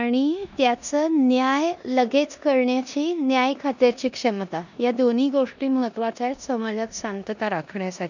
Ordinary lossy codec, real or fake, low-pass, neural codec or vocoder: none; fake; 7.2 kHz; codec, 16 kHz in and 24 kHz out, 0.9 kbps, LongCat-Audio-Codec, four codebook decoder